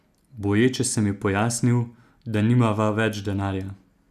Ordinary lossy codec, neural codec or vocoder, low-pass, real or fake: none; none; 14.4 kHz; real